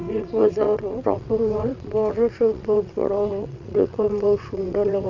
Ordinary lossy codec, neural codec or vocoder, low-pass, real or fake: none; vocoder, 22.05 kHz, 80 mel bands, WaveNeXt; 7.2 kHz; fake